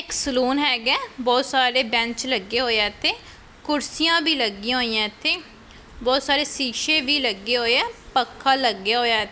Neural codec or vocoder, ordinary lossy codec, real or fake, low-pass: none; none; real; none